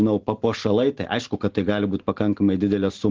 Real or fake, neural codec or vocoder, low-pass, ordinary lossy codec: real; none; 7.2 kHz; Opus, 16 kbps